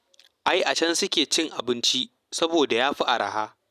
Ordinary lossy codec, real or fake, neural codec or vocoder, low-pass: none; real; none; 14.4 kHz